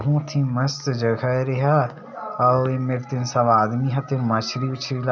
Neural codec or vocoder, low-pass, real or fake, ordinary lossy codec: none; 7.2 kHz; real; none